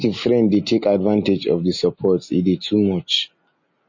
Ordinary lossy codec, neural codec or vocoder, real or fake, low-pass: MP3, 32 kbps; none; real; 7.2 kHz